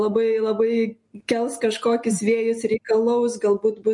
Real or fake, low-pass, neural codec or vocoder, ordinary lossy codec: real; 10.8 kHz; none; MP3, 48 kbps